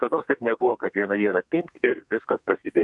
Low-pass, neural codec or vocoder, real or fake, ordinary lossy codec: 10.8 kHz; codec, 32 kHz, 1.9 kbps, SNAC; fake; MP3, 64 kbps